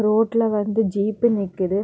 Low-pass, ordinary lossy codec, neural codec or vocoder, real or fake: none; none; none; real